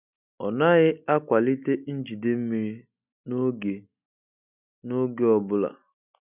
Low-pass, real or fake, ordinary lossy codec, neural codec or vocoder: 3.6 kHz; real; none; none